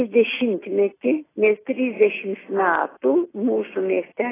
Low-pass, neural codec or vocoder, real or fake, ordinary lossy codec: 3.6 kHz; none; real; AAC, 16 kbps